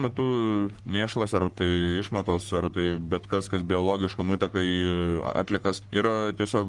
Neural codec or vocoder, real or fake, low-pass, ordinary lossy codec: codec, 44.1 kHz, 3.4 kbps, Pupu-Codec; fake; 10.8 kHz; Opus, 32 kbps